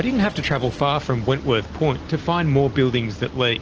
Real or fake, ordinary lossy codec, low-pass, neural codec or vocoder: real; Opus, 16 kbps; 7.2 kHz; none